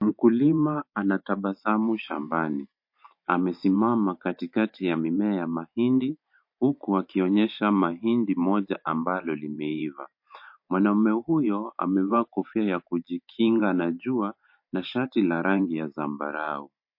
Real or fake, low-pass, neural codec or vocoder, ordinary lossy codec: fake; 5.4 kHz; vocoder, 44.1 kHz, 128 mel bands every 512 samples, BigVGAN v2; MP3, 32 kbps